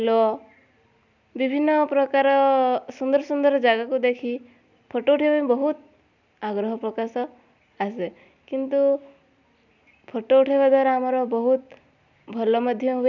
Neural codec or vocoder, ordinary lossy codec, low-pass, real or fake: none; none; 7.2 kHz; real